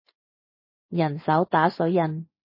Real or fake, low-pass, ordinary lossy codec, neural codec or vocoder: real; 5.4 kHz; MP3, 24 kbps; none